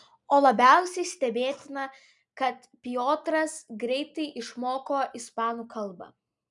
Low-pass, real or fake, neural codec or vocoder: 10.8 kHz; real; none